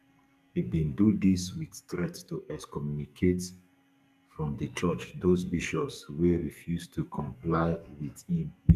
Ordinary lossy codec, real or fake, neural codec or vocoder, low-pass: none; fake; codec, 44.1 kHz, 2.6 kbps, SNAC; 14.4 kHz